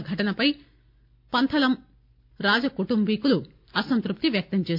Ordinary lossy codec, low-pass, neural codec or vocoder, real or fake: MP3, 48 kbps; 5.4 kHz; none; real